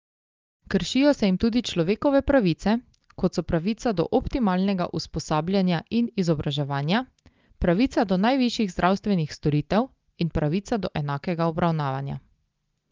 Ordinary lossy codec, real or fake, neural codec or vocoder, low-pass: Opus, 24 kbps; real; none; 7.2 kHz